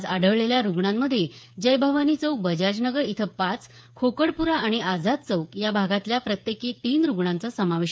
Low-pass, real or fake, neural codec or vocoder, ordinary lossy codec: none; fake; codec, 16 kHz, 8 kbps, FreqCodec, smaller model; none